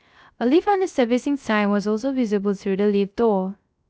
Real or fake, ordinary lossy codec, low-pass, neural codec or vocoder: fake; none; none; codec, 16 kHz, 0.3 kbps, FocalCodec